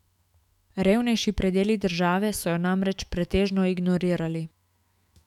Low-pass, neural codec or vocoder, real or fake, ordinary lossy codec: 19.8 kHz; autoencoder, 48 kHz, 128 numbers a frame, DAC-VAE, trained on Japanese speech; fake; none